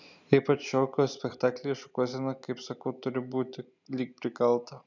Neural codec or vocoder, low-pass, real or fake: none; 7.2 kHz; real